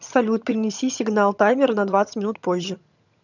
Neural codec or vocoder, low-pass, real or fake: vocoder, 22.05 kHz, 80 mel bands, HiFi-GAN; 7.2 kHz; fake